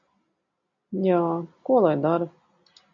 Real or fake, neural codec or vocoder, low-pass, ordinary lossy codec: real; none; 7.2 kHz; MP3, 48 kbps